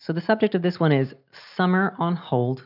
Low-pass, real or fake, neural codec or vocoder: 5.4 kHz; real; none